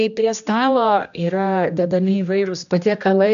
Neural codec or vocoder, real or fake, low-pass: codec, 16 kHz, 1 kbps, X-Codec, HuBERT features, trained on general audio; fake; 7.2 kHz